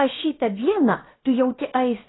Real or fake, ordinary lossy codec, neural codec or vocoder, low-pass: fake; AAC, 16 kbps; codec, 16 kHz, about 1 kbps, DyCAST, with the encoder's durations; 7.2 kHz